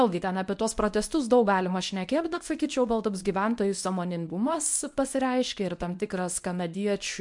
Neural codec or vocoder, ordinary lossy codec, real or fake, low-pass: codec, 24 kHz, 0.9 kbps, WavTokenizer, medium speech release version 1; MP3, 64 kbps; fake; 10.8 kHz